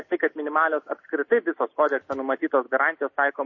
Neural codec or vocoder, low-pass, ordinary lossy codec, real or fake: none; 7.2 kHz; MP3, 32 kbps; real